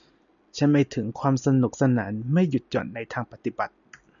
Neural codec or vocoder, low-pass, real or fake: none; 7.2 kHz; real